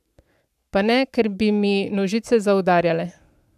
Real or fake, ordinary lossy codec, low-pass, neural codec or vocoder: fake; none; 14.4 kHz; codec, 44.1 kHz, 7.8 kbps, Pupu-Codec